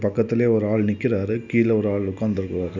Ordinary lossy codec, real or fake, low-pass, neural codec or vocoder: none; real; 7.2 kHz; none